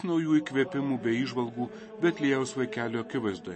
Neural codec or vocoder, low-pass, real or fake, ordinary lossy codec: none; 10.8 kHz; real; MP3, 32 kbps